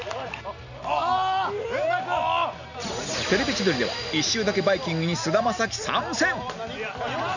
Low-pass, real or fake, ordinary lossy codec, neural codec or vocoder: 7.2 kHz; real; none; none